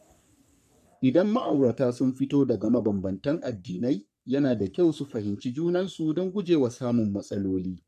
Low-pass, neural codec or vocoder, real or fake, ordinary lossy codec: 14.4 kHz; codec, 44.1 kHz, 3.4 kbps, Pupu-Codec; fake; none